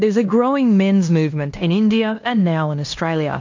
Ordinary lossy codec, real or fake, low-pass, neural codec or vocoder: MP3, 48 kbps; fake; 7.2 kHz; codec, 16 kHz in and 24 kHz out, 0.9 kbps, LongCat-Audio-Codec, fine tuned four codebook decoder